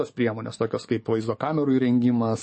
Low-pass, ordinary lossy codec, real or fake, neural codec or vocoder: 10.8 kHz; MP3, 32 kbps; fake; codec, 44.1 kHz, 7.8 kbps, DAC